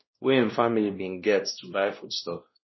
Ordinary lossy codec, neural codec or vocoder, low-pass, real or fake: MP3, 24 kbps; codec, 16 kHz, 1 kbps, X-Codec, WavLM features, trained on Multilingual LibriSpeech; 7.2 kHz; fake